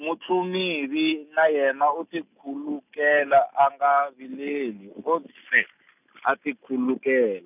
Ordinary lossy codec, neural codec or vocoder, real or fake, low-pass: none; none; real; 3.6 kHz